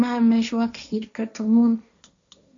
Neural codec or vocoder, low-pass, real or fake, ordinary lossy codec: codec, 16 kHz, 1.1 kbps, Voila-Tokenizer; 7.2 kHz; fake; AAC, 64 kbps